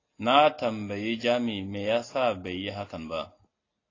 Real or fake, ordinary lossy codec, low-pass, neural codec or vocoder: real; AAC, 32 kbps; 7.2 kHz; none